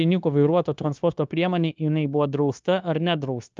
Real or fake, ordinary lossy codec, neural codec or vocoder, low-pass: fake; Opus, 24 kbps; codec, 16 kHz, 0.9 kbps, LongCat-Audio-Codec; 7.2 kHz